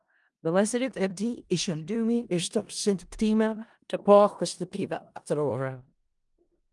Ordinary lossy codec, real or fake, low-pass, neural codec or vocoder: Opus, 32 kbps; fake; 10.8 kHz; codec, 16 kHz in and 24 kHz out, 0.4 kbps, LongCat-Audio-Codec, four codebook decoder